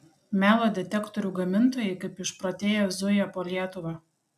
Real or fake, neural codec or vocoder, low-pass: real; none; 14.4 kHz